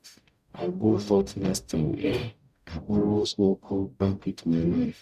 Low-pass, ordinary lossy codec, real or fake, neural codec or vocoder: 14.4 kHz; none; fake; codec, 44.1 kHz, 0.9 kbps, DAC